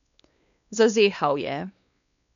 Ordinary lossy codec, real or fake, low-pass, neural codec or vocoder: none; fake; 7.2 kHz; codec, 16 kHz, 2 kbps, X-Codec, WavLM features, trained on Multilingual LibriSpeech